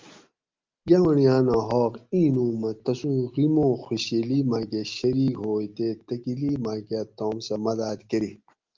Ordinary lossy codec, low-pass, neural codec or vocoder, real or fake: Opus, 32 kbps; 7.2 kHz; none; real